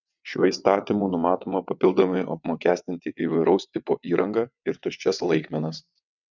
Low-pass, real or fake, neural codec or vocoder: 7.2 kHz; fake; vocoder, 22.05 kHz, 80 mel bands, WaveNeXt